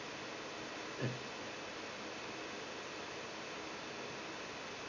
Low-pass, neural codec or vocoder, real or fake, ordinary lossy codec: 7.2 kHz; none; real; none